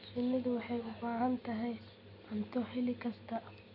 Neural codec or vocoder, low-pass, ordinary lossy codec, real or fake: none; 5.4 kHz; none; real